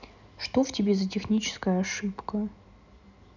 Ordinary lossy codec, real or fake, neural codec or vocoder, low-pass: none; real; none; 7.2 kHz